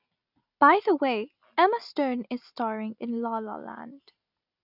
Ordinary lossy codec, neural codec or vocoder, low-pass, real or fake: none; none; 5.4 kHz; real